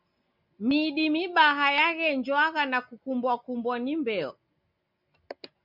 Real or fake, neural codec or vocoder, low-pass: real; none; 5.4 kHz